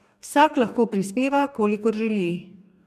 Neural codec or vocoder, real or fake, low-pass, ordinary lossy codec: codec, 44.1 kHz, 2.6 kbps, DAC; fake; 14.4 kHz; none